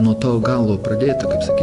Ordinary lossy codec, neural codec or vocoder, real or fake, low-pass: AAC, 64 kbps; none; real; 10.8 kHz